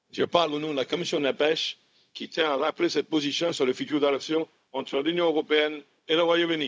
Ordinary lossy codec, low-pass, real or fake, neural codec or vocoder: none; none; fake; codec, 16 kHz, 0.4 kbps, LongCat-Audio-Codec